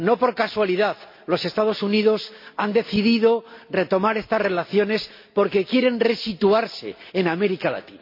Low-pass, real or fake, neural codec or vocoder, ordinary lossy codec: 5.4 kHz; real; none; MP3, 32 kbps